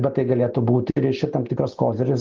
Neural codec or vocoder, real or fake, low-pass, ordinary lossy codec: none; real; 7.2 kHz; Opus, 32 kbps